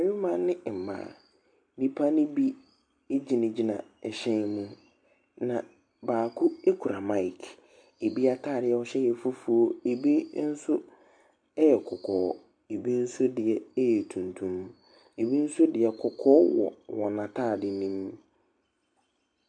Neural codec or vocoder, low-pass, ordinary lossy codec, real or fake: none; 9.9 kHz; MP3, 64 kbps; real